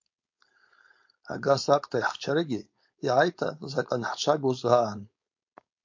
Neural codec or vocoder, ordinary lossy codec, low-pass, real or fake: codec, 16 kHz, 4.8 kbps, FACodec; MP3, 48 kbps; 7.2 kHz; fake